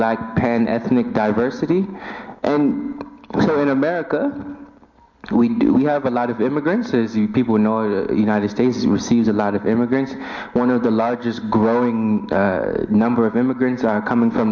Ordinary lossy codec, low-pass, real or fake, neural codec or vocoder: MP3, 48 kbps; 7.2 kHz; real; none